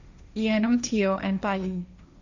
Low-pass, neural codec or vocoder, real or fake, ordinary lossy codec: 7.2 kHz; codec, 16 kHz, 1.1 kbps, Voila-Tokenizer; fake; none